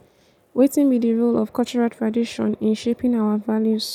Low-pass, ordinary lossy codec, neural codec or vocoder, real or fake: 19.8 kHz; none; none; real